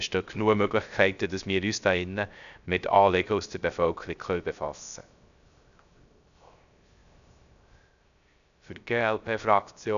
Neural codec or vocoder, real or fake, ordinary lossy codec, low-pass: codec, 16 kHz, 0.3 kbps, FocalCodec; fake; none; 7.2 kHz